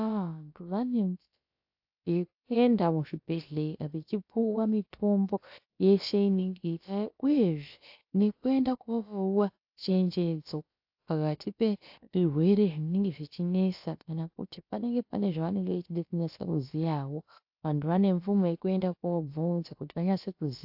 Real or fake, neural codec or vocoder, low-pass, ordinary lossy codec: fake; codec, 16 kHz, about 1 kbps, DyCAST, with the encoder's durations; 5.4 kHz; AAC, 48 kbps